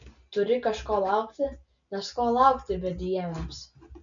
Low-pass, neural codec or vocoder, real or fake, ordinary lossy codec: 7.2 kHz; none; real; MP3, 96 kbps